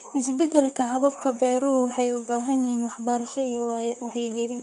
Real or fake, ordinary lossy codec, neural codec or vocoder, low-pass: fake; none; codec, 24 kHz, 1 kbps, SNAC; 10.8 kHz